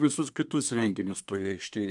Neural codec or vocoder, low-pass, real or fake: codec, 24 kHz, 1 kbps, SNAC; 10.8 kHz; fake